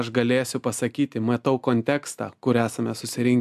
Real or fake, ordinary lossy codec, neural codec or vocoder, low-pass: real; MP3, 96 kbps; none; 14.4 kHz